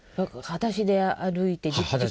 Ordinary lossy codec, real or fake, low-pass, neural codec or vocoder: none; real; none; none